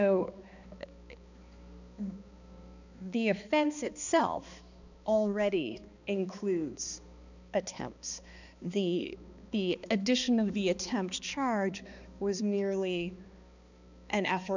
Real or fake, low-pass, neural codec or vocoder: fake; 7.2 kHz; codec, 16 kHz, 2 kbps, X-Codec, HuBERT features, trained on balanced general audio